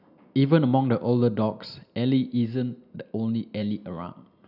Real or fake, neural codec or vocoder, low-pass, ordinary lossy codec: real; none; 5.4 kHz; none